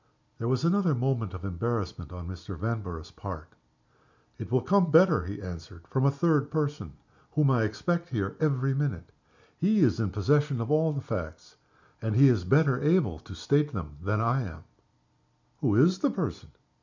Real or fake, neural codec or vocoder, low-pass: real; none; 7.2 kHz